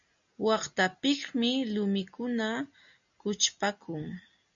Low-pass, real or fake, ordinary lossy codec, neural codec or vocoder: 7.2 kHz; real; MP3, 64 kbps; none